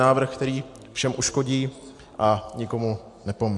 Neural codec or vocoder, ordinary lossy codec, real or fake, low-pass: none; AAC, 64 kbps; real; 10.8 kHz